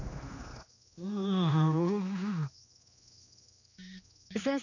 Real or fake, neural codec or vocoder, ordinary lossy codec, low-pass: fake; codec, 16 kHz, 2 kbps, X-Codec, HuBERT features, trained on general audio; none; 7.2 kHz